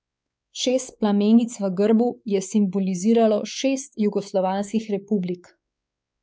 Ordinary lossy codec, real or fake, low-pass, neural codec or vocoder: none; fake; none; codec, 16 kHz, 4 kbps, X-Codec, WavLM features, trained on Multilingual LibriSpeech